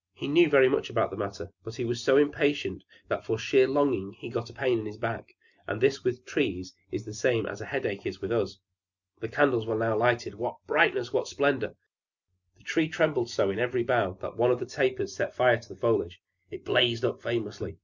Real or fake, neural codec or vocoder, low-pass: real; none; 7.2 kHz